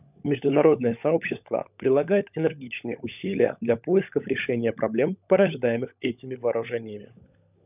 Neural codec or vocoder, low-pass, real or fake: codec, 16 kHz, 16 kbps, FunCodec, trained on LibriTTS, 50 frames a second; 3.6 kHz; fake